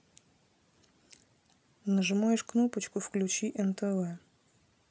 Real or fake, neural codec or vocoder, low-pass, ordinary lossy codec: real; none; none; none